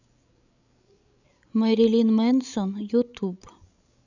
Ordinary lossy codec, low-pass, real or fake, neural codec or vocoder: none; 7.2 kHz; fake; codec, 16 kHz, 16 kbps, FreqCodec, larger model